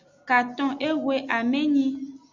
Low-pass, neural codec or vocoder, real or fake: 7.2 kHz; none; real